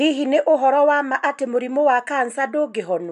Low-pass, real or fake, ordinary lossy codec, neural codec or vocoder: 10.8 kHz; real; none; none